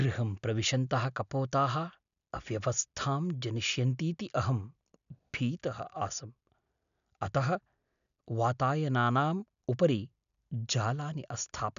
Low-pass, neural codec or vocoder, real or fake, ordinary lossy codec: 7.2 kHz; none; real; none